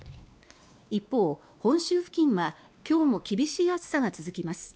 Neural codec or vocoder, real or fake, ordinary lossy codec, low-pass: codec, 16 kHz, 2 kbps, X-Codec, WavLM features, trained on Multilingual LibriSpeech; fake; none; none